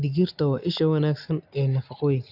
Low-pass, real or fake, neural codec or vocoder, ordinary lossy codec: 5.4 kHz; real; none; AAC, 48 kbps